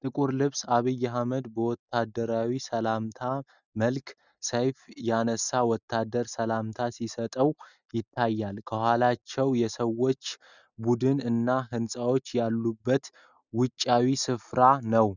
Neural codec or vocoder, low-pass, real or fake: none; 7.2 kHz; real